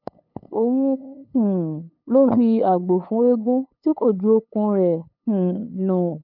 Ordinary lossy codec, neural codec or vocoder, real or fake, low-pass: none; codec, 16 kHz, 8 kbps, FunCodec, trained on LibriTTS, 25 frames a second; fake; 5.4 kHz